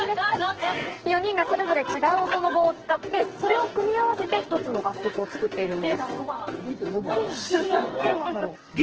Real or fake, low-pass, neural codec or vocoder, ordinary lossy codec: fake; 7.2 kHz; codec, 44.1 kHz, 2.6 kbps, SNAC; Opus, 16 kbps